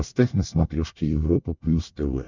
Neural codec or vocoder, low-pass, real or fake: codec, 24 kHz, 1 kbps, SNAC; 7.2 kHz; fake